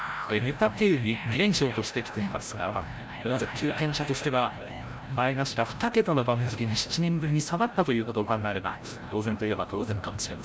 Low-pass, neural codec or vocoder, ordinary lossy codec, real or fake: none; codec, 16 kHz, 0.5 kbps, FreqCodec, larger model; none; fake